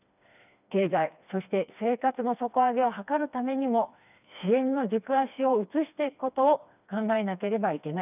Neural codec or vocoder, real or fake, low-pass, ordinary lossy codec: codec, 16 kHz, 2 kbps, FreqCodec, smaller model; fake; 3.6 kHz; none